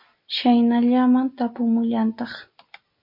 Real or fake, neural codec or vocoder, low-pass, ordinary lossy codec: real; none; 5.4 kHz; MP3, 32 kbps